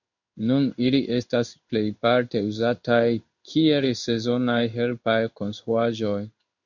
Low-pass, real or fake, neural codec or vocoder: 7.2 kHz; fake; codec, 16 kHz in and 24 kHz out, 1 kbps, XY-Tokenizer